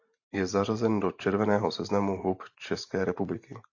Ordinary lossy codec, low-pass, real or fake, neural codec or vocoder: AAC, 48 kbps; 7.2 kHz; fake; vocoder, 44.1 kHz, 128 mel bands every 512 samples, BigVGAN v2